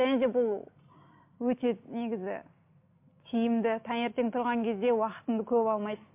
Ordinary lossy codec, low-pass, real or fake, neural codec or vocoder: MP3, 32 kbps; 3.6 kHz; real; none